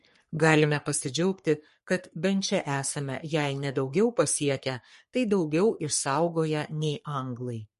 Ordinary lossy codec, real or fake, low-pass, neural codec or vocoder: MP3, 48 kbps; fake; 14.4 kHz; codec, 44.1 kHz, 3.4 kbps, Pupu-Codec